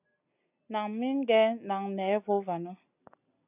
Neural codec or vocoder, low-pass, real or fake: none; 3.6 kHz; real